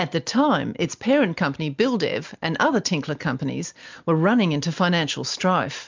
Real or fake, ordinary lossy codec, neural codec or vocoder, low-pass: real; MP3, 64 kbps; none; 7.2 kHz